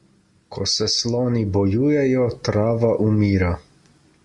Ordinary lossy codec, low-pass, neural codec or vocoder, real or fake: Opus, 64 kbps; 10.8 kHz; none; real